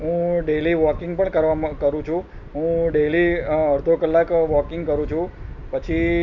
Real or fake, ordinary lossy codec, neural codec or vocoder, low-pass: real; none; none; 7.2 kHz